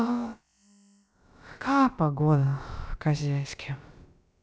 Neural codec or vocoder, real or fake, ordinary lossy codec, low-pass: codec, 16 kHz, about 1 kbps, DyCAST, with the encoder's durations; fake; none; none